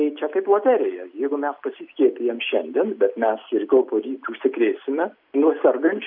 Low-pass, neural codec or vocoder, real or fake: 5.4 kHz; none; real